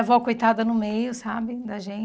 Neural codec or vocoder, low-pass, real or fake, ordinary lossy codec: none; none; real; none